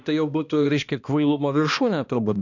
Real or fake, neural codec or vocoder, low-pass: fake; codec, 16 kHz, 1 kbps, X-Codec, HuBERT features, trained on balanced general audio; 7.2 kHz